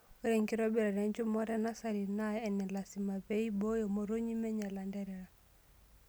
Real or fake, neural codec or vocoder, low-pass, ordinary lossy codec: real; none; none; none